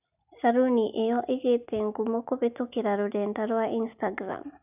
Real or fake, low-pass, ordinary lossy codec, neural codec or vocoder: real; 3.6 kHz; none; none